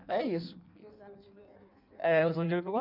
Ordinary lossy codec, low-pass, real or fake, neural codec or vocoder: none; 5.4 kHz; fake; codec, 16 kHz in and 24 kHz out, 1.1 kbps, FireRedTTS-2 codec